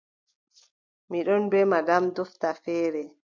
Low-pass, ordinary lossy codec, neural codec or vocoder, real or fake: 7.2 kHz; MP3, 64 kbps; none; real